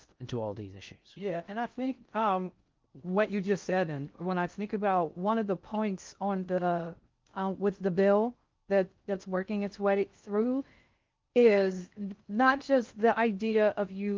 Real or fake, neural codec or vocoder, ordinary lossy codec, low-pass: fake; codec, 16 kHz in and 24 kHz out, 0.6 kbps, FocalCodec, streaming, 2048 codes; Opus, 32 kbps; 7.2 kHz